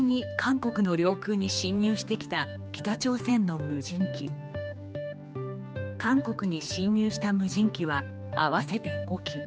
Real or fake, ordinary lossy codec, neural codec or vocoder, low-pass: fake; none; codec, 16 kHz, 2 kbps, X-Codec, HuBERT features, trained on general audio; none